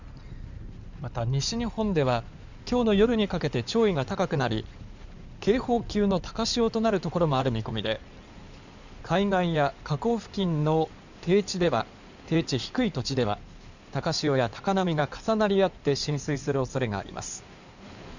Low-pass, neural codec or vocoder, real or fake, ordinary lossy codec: 7.2 kHz; codec, 16 kHz in and 24 kHz out, 2.2 kbps, FireRedTTS-2 codec; fake; none